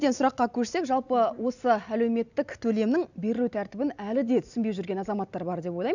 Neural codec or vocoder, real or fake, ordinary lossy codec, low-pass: none; real; none; 7.2 kHz